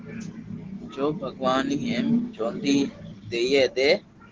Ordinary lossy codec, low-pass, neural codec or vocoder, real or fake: Opus, 16 kbps; 7.2 kHz; none; real